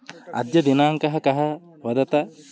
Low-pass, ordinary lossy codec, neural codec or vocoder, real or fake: none; none; none; real